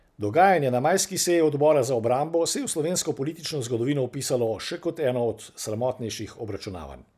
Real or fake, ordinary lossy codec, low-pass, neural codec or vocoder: real; none; 14.4 kHz; none